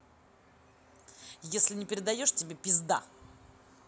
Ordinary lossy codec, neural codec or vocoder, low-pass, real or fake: none; none; none; real